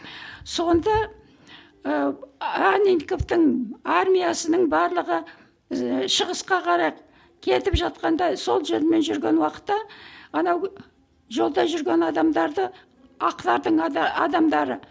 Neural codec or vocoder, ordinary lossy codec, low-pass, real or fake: none; none; none; real